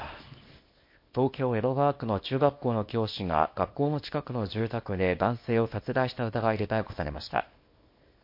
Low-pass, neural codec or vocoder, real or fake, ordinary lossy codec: 5.4 kHz; codec, 24 kHz, 0.9 kbps, WavTokenizer, small release; fake; MP3, 32 kbps